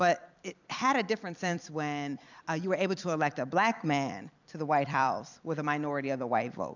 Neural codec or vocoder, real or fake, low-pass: vocoder, 44.1 kHz, 128 mel bands every 256 samples, BigVGAN v2; fake; 7.2 kHz